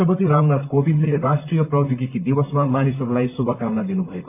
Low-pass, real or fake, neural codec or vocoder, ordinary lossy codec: 3.6 kHz; fake; vocoder, 44.1 kHz, 128 mel bands, Pupu-Vocoder; none